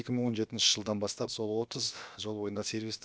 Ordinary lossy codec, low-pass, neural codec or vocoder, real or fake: none; none; codec, 16 kHz, about 1 kbps, DyCAST, with the encoder's durations; fake